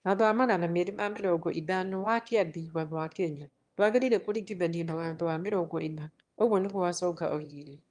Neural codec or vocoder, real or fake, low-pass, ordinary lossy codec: autoencoder, 22.05 kHz, a latent of 192 numbers a frame, VITS, trained on one speaker; fake; 9.9 kHz; Opus, 32 kbps